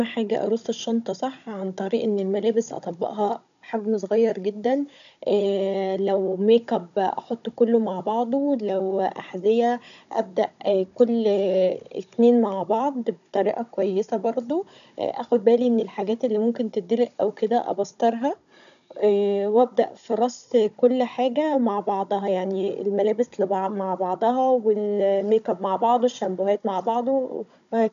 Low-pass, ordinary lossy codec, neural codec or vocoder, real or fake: 7.2 kHz; none; codec, 16 kHz, 16 kbps, FunCodec, trained on Chinese and English, 50 frames a second; fake